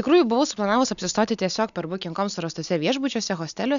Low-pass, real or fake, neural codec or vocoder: 7.2 kHz; real; none